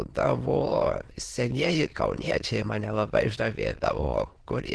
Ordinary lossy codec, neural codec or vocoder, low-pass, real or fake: Opus, 16 kbps; autoencoder, 22.05 kHz, a latent of 192 numbers a frame, VITS, trained on many speakers; 9.9 kHz; fake